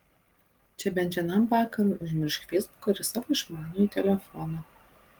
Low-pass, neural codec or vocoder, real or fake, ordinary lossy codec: 19.8 kHz; none; real; Opus, 24 kbps